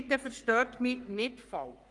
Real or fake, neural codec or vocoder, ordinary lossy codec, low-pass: fake; codec, 44.1 kHz, 3.4 kbps, Pupu-Codec; Opus, 32 kbps; 10.8 kHz